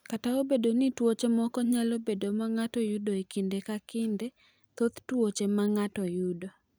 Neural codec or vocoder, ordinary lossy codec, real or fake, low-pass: none; none; real; none